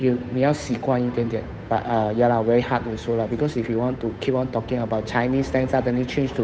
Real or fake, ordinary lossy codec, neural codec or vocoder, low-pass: fake; none; codec, 16 kHz, 8 kbps, FunCodec, trained on Chinese and English, 25 frames a second; none